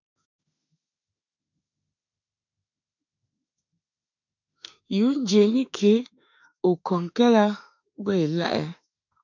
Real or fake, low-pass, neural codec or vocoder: fake; 7.2 kHz; autoencoder, 48 kHz, 32 numbers a frame, DAC-VAE, trained on Japanese speech